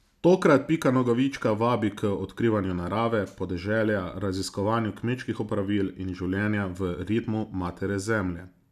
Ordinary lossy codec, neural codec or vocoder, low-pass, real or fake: none; none; 14.4 kHz; real